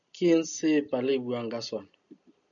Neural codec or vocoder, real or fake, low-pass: none; real; 7.2 kHz